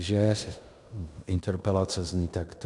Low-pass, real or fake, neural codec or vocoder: 10.8 kHz; fake; codec, 16 kHz in and 24 kHz out, 0.9 kbps, LongCat-Audio-Codec, fine tuned four codebook decoder